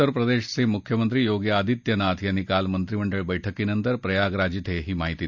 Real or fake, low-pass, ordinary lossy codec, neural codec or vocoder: real; 7.2 kHz; none; none